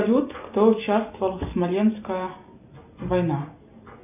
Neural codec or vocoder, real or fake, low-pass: none; real; 3.6 kHz